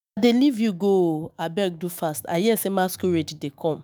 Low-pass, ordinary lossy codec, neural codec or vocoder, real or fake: none; none; autoencoder, 48 kHz, 128 numbers a frame, DAC-VAE, trained on Japanese speech; fake